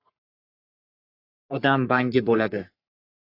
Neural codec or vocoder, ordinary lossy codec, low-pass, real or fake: codec, 44.1 kHz, 3.4 kbps, Pupu-Codec; AAC, 32 kbps; 5.4 kHz; fake